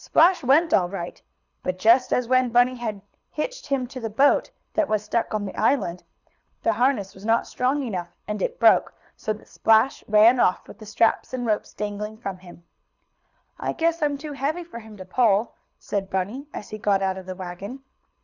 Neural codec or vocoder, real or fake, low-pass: codec, 24 kHz, 6 kbps, HILCodec; fake; 7.2 kHz